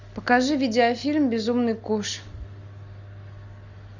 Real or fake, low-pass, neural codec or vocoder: real; 7.2 kHz; none